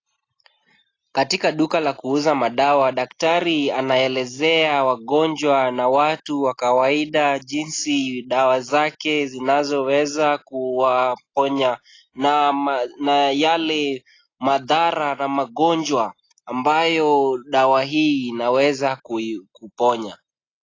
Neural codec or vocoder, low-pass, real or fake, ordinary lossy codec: none; 7.2 kHz; real; AAC, 32 kbps